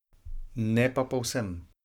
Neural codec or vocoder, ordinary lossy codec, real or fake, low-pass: codec, 44.1 kHz, 7.8 kbps, Pupu-Codec; none; fake; 19.8 kHz